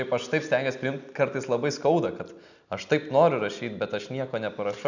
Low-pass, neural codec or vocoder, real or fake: 7.2 kHz; none; real